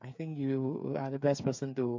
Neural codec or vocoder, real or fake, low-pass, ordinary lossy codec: codec, 16 kHz, 8 kbps, FreqCodec, smaller model; fake; 7.2 kHz; MP3, 48 kbps